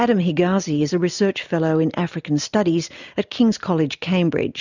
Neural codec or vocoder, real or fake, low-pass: none; real; 7.2 kHz